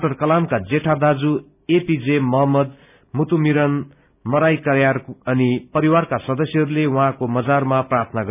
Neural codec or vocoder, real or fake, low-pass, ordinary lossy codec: none; real; 3.6 kHz; none